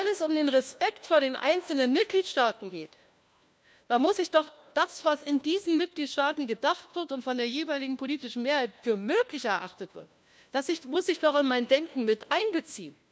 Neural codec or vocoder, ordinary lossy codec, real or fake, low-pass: codec, 16 kHz, 1 kbps, FunCodec, trained on LibriTTS, 50 frames a second; none; fake; none